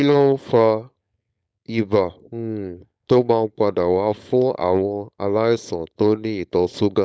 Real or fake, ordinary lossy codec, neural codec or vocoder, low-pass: fake; none; codec, 16 kHz, 4.8 kbps, FACodec; none